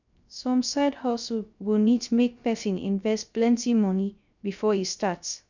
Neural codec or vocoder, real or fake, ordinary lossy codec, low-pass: codec, 16 kHz, 0.3 kbps, FocalCodec; fake; none; 7.2 kHz